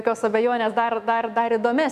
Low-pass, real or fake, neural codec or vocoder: 14.4 kHz; fake; autoencoder, 48 kHz, 128 numbers a frame, DAC-VAE, trained on Japanese speech